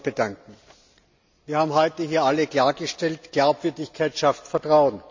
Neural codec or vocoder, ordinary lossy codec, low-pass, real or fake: none; MP3, 64 kbps; 7.2 kHz; real